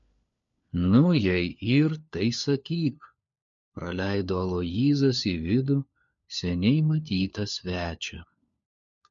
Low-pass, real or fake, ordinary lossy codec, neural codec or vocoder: 7.2 kHz; fake; MP3, 48 kbps; codec, 16 kHz, 4 kbps, FunCodec, trained on LibriTTS, 50 frames a second